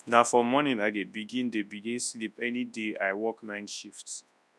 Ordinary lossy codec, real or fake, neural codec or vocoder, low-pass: none; fake; codec, 24 kHz, 0.9 kbps, WavTokenizer, large speech release; none